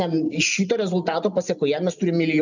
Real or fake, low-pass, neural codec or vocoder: real; 7.2 kHz; none